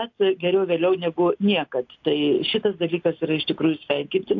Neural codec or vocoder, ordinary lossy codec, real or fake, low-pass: none; AAC, 48 kbps; real; 7.2 kHz